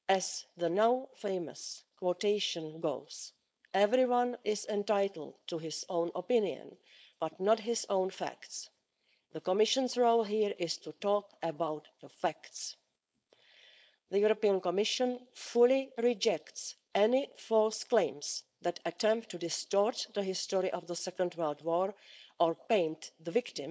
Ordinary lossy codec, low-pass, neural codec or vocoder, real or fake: none; none; codec, 16 kHz, 4.8 kbps, FACodec; fake